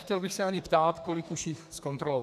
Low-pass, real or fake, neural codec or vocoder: 14.4 kHz; fake; codec, 32 kHz, 1.9 kbps, SNAC